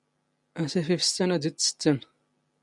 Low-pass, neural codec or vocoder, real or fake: 10.8 kHz; none; real